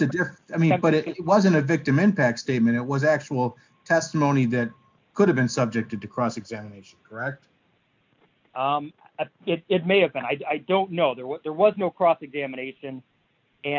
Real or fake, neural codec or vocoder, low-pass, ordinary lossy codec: real; none; 7.2 kHz; MP3, 64 kbps